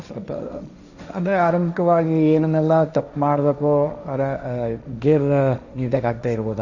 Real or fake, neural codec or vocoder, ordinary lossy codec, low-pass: fake; codec, 16 kHz, 1.1 kbps, Voila-Tokenizer; none; 7.2 kHz